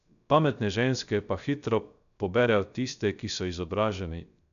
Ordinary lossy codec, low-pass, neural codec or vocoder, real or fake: none; 7.2 kHz; codec, 16 kHz, 0.3 kbps, FocalCodec; fake